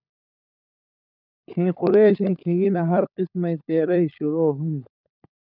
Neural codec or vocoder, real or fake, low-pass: codec, 16 kHz, 16 kbps, FunCodec, trained on LibriTTS, 50 frames a second; fake; 5.4 kHz